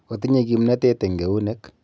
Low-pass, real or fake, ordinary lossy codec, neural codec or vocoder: none; real; none; none